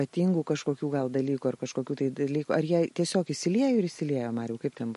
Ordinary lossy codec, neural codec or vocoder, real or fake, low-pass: MP3, 48 kbps; none; real; 14.4 kHz